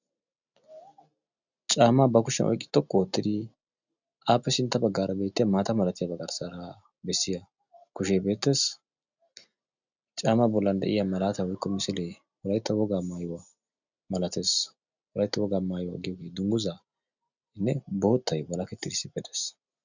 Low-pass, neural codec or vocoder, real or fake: 7.2 kHz; none; real